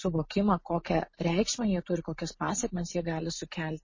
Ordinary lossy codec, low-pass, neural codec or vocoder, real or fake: MP3, 32 kbps; 7.2 kHz; none; real